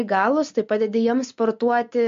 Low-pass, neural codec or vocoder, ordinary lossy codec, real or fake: 7.2 kHz; none; MP3, 48 kbps; real